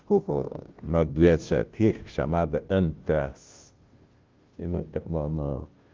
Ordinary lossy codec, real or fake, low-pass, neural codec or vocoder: Opus, 32 kbps; fake; 7.2 kHz; codec, 16 kHz, 0.5 kbps, FunCodec, trained on Chinese and English, 25 frames a second